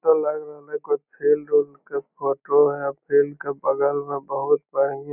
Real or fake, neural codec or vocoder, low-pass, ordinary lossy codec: real; none; 3.6 kHz; none